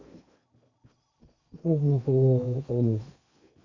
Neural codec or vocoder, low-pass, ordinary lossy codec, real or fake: codec, 16 kHz in and 24 kHz out, 0.8 kbps, FocalCodec, streaming, 65536 codes; 7.2 kHz; Opus, 64 kbps; fake